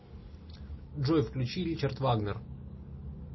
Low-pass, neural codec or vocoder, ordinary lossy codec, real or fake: 7.2 kHz; none; MP3, 24 kbps; real